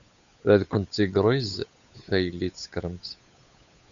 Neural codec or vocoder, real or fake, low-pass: codec, 16 kHz, 8 kbps, FunCodec, trained on Chinese and English, 25 frames a second; fake; 7.2 kHz